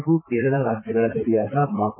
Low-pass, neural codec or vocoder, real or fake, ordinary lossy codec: 3.6 kHz; vocoder, 44.1 kHz, 128 mel bands, Pupu-Vocoder; fake; MP3, 24 kbps